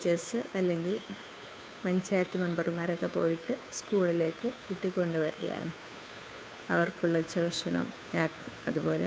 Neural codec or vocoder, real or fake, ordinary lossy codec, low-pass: codec, 16 kHz, 2 kbps, FunCodec, trained on Chinese and English, 25 frames a second; fake; none; none